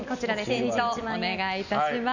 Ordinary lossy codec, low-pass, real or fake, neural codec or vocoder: none; 7.2 kHz; real; none